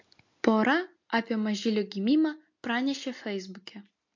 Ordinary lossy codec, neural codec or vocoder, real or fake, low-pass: MP3, 48 kbps; none; real; 7.2 kHz